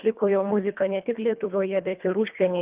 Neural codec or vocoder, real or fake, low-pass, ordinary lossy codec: codec, 24 kHz, 1.5 kbps, HILCodec; fake; 3.6 kHz; Opus, 32 kbps